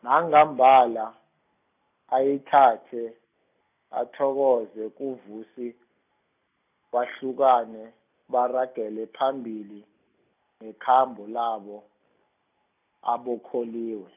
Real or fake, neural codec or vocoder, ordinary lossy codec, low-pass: real; none; none; 3.6 kHz